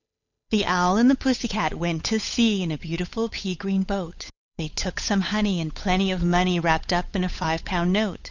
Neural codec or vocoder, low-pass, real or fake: codec, 16 kHz, 8 kbps, FunCodec, trained on Chinese and English, 25 frames a second; 7.2 kHz; fake